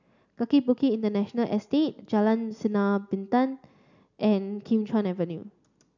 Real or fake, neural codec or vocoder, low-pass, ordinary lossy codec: real; none; 7.2 kHz; none